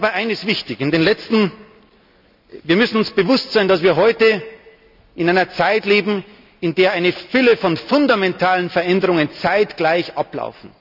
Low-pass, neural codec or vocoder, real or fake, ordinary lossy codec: 5.4 kHz; none; real; none